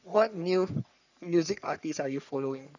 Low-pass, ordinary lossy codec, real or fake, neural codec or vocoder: 7.2 kHz; none; fake; codec, 44.1 kHz, 3.4 kbps, Pupu-Codec